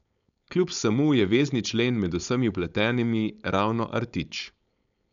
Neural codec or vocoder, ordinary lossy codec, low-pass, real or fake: codec, 16 kHz, 4.8 kbps, FACodec; none; 7.2 kHz; fake